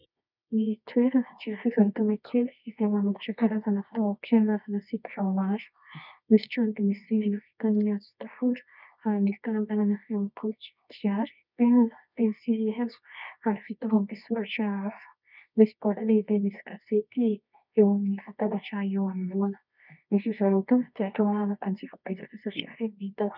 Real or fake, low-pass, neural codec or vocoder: fake; 5.4 kHz; codec, 24 kHz, 0.9 kbps, WavTokenizer, medium music audio release